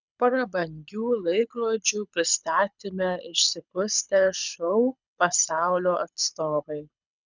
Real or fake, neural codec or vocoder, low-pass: fake; codec, 24 kHz, 6 kbps, HILCodec; 7.2 kHz